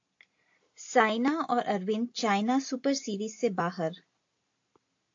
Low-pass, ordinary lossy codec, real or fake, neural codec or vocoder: 7.2 kHz; AAC, 48 kbps; real; none